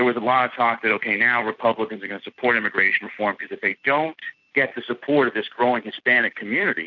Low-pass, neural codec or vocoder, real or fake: 7.2 kHz; none; real